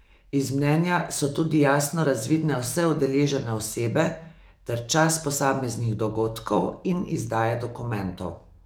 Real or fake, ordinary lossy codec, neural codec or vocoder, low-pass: fake; none; codec, 44.1 kHz, 7.8 kbps, DAC; none